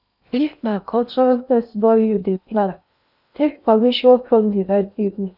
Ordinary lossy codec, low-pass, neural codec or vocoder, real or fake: none; 5.4 kHz; codec, 16 kHz in and 24 kHz out, 0.6 kbps, FocalCodec, streaming, 2048 codes; fake